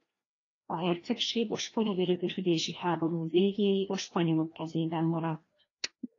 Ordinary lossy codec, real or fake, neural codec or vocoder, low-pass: AAC, 32 kbps; fake; codec, 16 kHz, 1 kbps, FreqCodec, larger model; 7.2 kHz